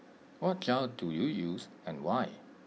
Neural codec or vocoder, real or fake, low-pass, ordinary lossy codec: none; real; none; none